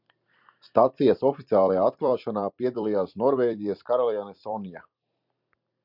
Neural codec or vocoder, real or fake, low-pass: vocoder, 44.1 kHz, 128 mel bands every 256 samples, BigVGAN v2; fake; 5.4 kHz